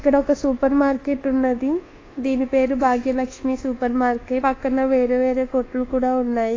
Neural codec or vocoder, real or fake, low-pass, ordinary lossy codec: codec, 24 kHz, 1.2 kbps, DualCodec; fake; 7.2 kHz; AAC, 32 kbps